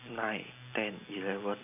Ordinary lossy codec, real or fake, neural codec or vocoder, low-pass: none; real; none; 3.6 kHz